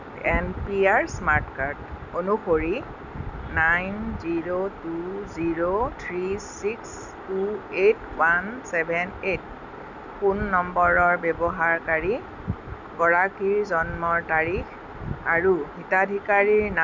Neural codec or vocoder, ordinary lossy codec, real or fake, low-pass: none; none; real; 7.2 kHz